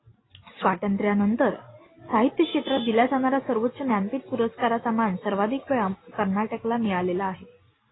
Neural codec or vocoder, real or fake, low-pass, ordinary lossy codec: none; real; 7.2 kHz; AAC, 16 kbps